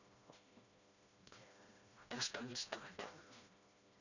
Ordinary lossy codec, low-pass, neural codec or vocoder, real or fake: none; 7.2 kHz; codec, 16 kHz, 1 kbps, FreqCodec, smaller model; fake